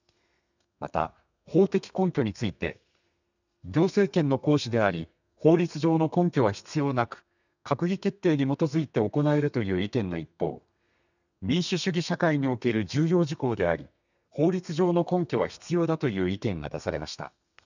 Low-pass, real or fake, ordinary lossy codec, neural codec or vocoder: 7.2 kHz; fake; none; codec, 32 kHz, 1.9 kbps, SNAC